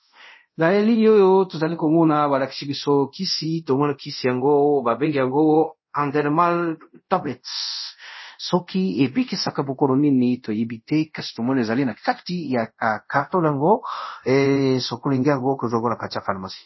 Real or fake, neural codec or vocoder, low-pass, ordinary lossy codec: fake; codec, 24 kHz, 0.5 kbps, DualCodec; 7.2 kHz; MP3, 24 kbps